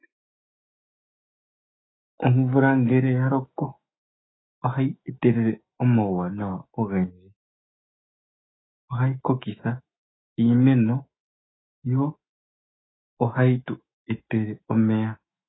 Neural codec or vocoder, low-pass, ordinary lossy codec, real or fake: autoencoder, 48 kHz, 128 numbers a frame, DAC-VAE, trained on Japanese speech; 7.2 kHz; AAC, 16 kbps; fake